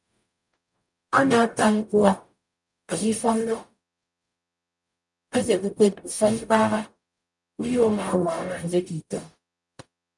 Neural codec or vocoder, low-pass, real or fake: codec, 44.1 kHz, 0.9 kbps, DAC; 10.8 kHz; fake